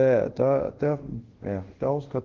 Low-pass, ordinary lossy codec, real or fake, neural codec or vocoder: 7.2 kHz; Opus, 16 kbps; fake; codec, 24 kHz, 0.5 kbps, DualCodec